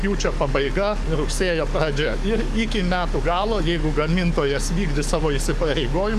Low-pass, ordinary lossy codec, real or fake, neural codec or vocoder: 14.4 kHz; MP3, 96 kbps; fake; codec, 44.1 kHz, 7.8 kbps, DAC